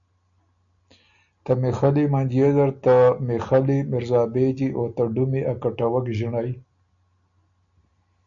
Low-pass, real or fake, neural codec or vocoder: 7.2 kHz; real; none